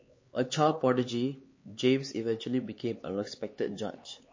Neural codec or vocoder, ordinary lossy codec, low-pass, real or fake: codec, 16 kHz, 4 kbps, X-Codec, HuBERT features, trained on LibriSpeech; MP3, 32 kbps; 7.2 kHz; fake